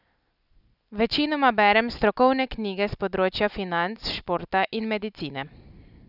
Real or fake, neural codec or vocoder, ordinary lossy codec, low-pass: real; none; none; 5.4 kHz